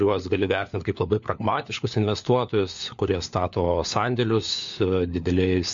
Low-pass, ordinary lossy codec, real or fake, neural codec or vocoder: 7.2 kHz; MP3, 48 kbps; fake; codec, 16 kHz, 4 kbps, FunCodec, trained on LibriTTS, 50 frames a second